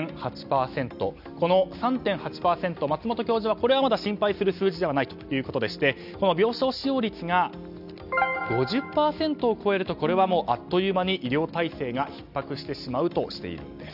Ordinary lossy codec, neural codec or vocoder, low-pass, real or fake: none; none; 5.4 kHz; real